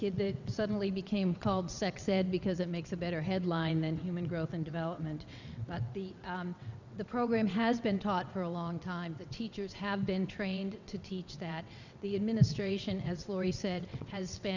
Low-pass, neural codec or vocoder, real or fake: 7.2 kHz; vocoder, 22.05 kHz, 80 mel bands, WaveNeXt; fake